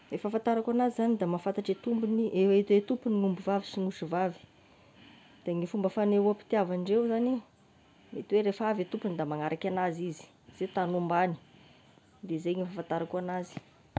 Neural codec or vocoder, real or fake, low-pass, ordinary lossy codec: none; real; none; none